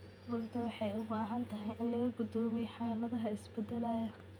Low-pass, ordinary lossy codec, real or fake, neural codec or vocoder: 19.8 kHz; none; fake; vocoder, 48 kHz, 128 mel bands, Vocos